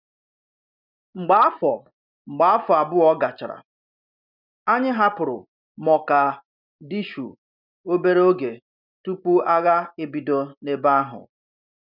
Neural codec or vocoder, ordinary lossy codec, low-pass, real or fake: none; none; 5.4 kHz; real